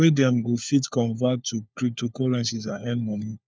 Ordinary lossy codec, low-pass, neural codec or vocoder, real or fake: none; none; codec, 16 kHz, 4 kbps, FunCodec, trained on LibriTTS, 50 frames a second; fake